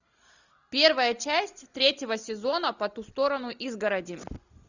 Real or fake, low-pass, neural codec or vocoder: real; 7.2 kHz; none